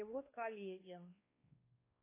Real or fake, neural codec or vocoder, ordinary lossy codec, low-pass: fake; codec, 16 kHz, 2 kbps, X-Codec, WavLM features, trained on Multilingual LibriSpeech; AAC, 24 kbps; 3.6 kHz